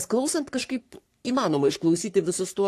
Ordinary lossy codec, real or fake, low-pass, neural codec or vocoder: AAC, 64 kbps; fake; 14.4 kHz; codec, 44.1 kHz, 3.4 kbps, Pupu-Codec